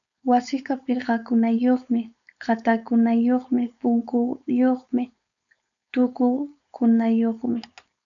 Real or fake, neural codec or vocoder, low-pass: fake; codec, 16 kHz, 4.8 kbps, FACodec; 7.2 kHz